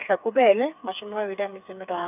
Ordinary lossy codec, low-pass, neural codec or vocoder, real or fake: none; 3.6 kHz; codec, 16 kHz, 4 kbps, FreqCodec, smaller model; fake